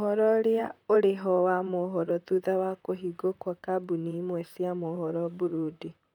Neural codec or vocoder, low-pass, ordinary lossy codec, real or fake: vocoder, 44.1 kHz, 128 mel bands, Pupu-Vocoder; 19.8 kHz; none; fake